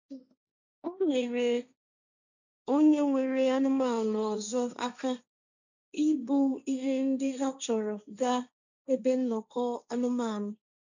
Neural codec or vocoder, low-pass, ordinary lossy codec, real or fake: codec, 16 kHz, 1.1 kbps, Voila-Tokenizer; 7.2 kHz; none; fake